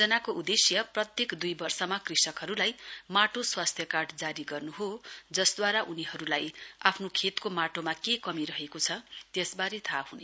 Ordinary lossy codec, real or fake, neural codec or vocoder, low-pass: none; real; none; none